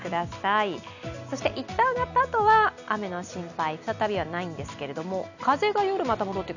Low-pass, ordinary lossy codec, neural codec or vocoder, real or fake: 7.2 kHz; none; none; real